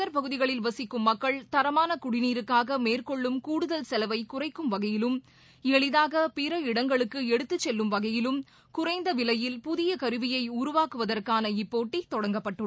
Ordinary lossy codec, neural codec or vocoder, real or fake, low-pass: none; none; real; none